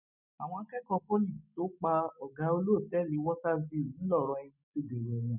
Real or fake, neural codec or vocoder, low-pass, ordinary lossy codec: real; none; 3.6 kHz; none